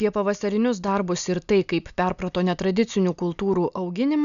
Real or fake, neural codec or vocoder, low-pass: real; none; 7.2 kHz